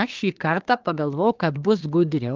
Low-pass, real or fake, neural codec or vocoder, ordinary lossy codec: 7.2 kHz; fake; codec, 24 kHz, 1 kbps, SNAC; Opus, 24 kbps